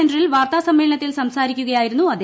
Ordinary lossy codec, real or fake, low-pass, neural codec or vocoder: none; real; none; none